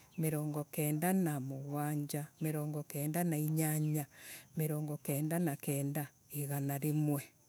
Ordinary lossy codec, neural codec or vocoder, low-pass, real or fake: none; autoencoder, 48 kHz, 128 numbers a frame, DAC-VAE, trained on Japanese speech; none; fake